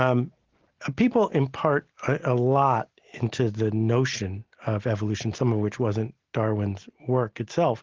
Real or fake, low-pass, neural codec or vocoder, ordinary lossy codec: real; 7.2 kHz; none; Opus, 16 kbps